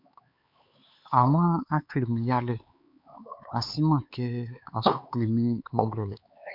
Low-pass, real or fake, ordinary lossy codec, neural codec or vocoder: 5.4 kHz; fake; MP3, 48 kbps; codec, 16 kHz, 4 kbps, X-Codec, HuBERT features, trained on LibriSpeech